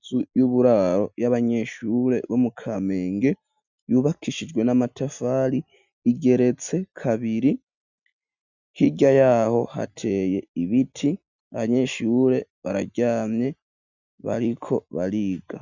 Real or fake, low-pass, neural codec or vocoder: real; 7.2 kHz; none